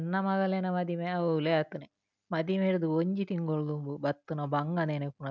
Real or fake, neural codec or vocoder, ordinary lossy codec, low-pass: real; none; none; 7.2 kHz